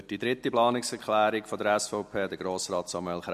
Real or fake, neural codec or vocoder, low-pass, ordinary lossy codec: real; none; 14.4 kHz; MP3, 64 kbps